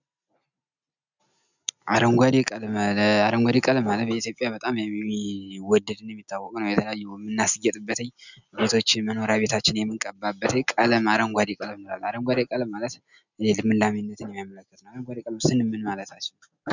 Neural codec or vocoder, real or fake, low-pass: none; real; 7.2 kHz